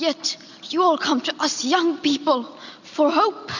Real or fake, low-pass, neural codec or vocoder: real; 7.2 kHz; none